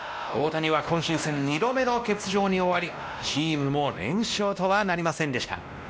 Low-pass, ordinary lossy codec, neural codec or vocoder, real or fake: none; none; codec, 16 kHz, 1 kbps, X-Codec, WavLM features, trained on Multilingual LibriSpeech; fake